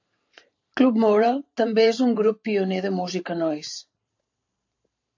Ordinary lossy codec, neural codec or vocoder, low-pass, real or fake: AAC, 48 kbps; vocoder, 44.1 kHz, 128 mel bands every 512 samples, BigVGAN v2; 7.2 kHz; fake